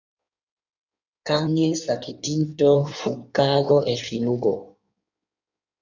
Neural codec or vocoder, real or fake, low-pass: codec, 16 kHz in and 24 kHz out, 1.1 kbps, FireRedTTS-2 codec; fake; 7.2 kHz